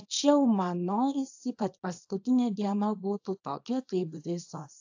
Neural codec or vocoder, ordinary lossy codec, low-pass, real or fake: codec, 24 kHz, 0.9 kbps, WavTokenizer, small release; AAC, 48 kbps; 7.2 kHz; fake